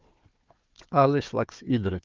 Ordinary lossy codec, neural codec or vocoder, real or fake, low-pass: Opus, 24 kbps; codec, 16 kHz, 4 kbps, FunCodec, trained on Chinese and English, 50 frames a second; fake; 7.2 kHz